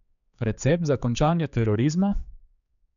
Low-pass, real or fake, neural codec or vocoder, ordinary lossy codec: 7.2 kHz; fake; codec, 16 kHz, 4 kbps, X-Codec, HuBERT features, trained on general audio; none